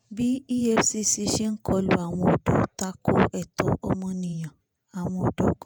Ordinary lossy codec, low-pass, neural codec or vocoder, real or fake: none; none; vocoder, 48 kHz, 128 mel bands, Vocos; fake